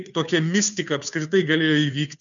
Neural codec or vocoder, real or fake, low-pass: codec, 16 kHz, 2 kbps, FunCodec, trained on Chinese and English, 25 frames a second; fake; 7.2 kHz